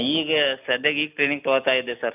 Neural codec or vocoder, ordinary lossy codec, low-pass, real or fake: none; none; 3.6 kHz; real